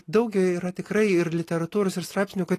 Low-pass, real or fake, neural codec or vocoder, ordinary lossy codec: 14.4 kHz; real; none; AAC, 48 kbps